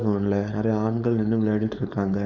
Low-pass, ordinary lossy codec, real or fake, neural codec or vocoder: 7.2 kHz; none; fake; codec, 16 kHz, 4.8 kbps, FACodec